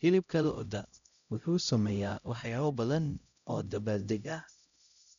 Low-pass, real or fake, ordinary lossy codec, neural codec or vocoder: 7.2 kHz; fake; MP3, 96 kbps; codec, 16 kHz, 0.5 kbps, X-Codec, HuBERT features, trained on LibriSpeech